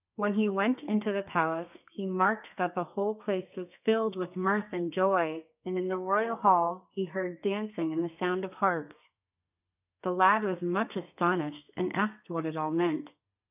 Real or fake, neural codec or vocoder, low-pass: fake; codec, 32 kHz, 1.9 kbps, SNAC; 3.6 kHz